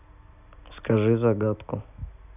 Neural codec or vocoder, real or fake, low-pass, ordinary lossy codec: none; real; 3.6 kHz; none